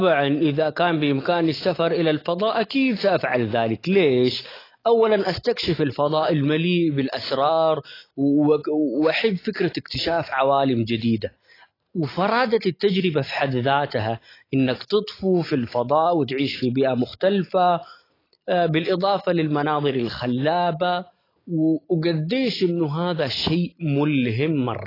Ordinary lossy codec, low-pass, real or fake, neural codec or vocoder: AAC, 24 kbps; 5.4 kHz; real; none